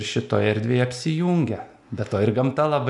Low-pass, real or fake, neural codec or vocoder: 10.8 kHz; real; none